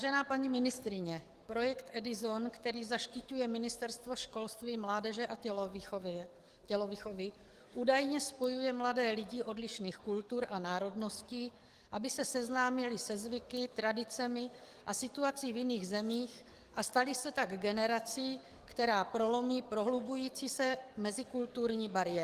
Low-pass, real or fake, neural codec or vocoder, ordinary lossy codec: 14.4 kHz; fake; codec, 44.1 kHz, 7.8 kbps, DAC; Opus, 24 kbps